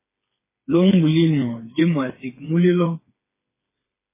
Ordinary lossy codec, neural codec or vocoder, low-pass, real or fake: MP3, 16 kbps; codec, 16 kHz, 4 kbps, FreqCodec, smaller model; 3.6 kHz; fake